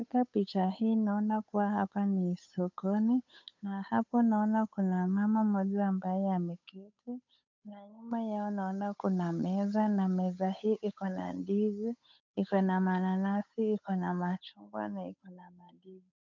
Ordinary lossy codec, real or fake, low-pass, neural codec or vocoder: MP3, 64 kbps; fake; 7.2 kHz; codec, 16 kHz, 8 kbps, FunCodec, trained on Chinese and English, 25 frames a second